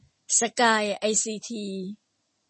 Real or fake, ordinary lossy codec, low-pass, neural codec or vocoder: real; MP3, 32 kbps; 9.9 kHz; none